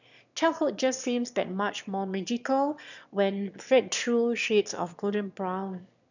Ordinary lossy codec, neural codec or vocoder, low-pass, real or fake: none; autoencoder, 22.05 kHz, a latent of 192 numbers a frame, VITS, trained on one speaker; 7.2 kHz; fake